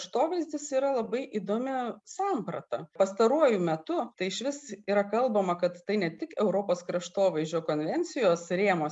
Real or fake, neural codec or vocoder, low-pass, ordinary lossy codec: real; none; 10.8 kHz; MP3, 96 kbps